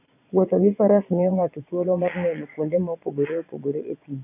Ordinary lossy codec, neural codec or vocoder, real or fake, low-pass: none; vocoder, 22.05 kHz, 80 mel bands, Vocos; fake; 3.6 kHz